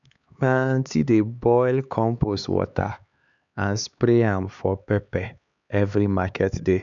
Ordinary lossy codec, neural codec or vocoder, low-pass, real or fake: none; codec, 16 kHz, 4 kbps, X-Codec, HuBERT features, trained on LibriSpeech; 7.2 kHz; fake